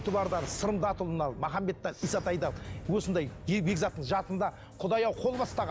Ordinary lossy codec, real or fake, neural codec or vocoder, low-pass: none; real; none; none